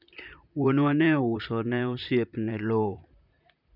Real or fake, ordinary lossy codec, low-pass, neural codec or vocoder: fake; none; 5.4 kHz; vocoder, 44.1 kHz, 128 mel bands, Pupu-Vocoder